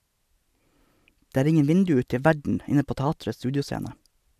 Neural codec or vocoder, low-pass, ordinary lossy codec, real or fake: none; 14.4 kHz; none; real